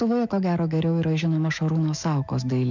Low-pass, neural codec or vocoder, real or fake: 7.2 kHz; autoencoder, 48 kHz, 128 numbers a frame, DAC-VAE, trained on Japanese speech; fake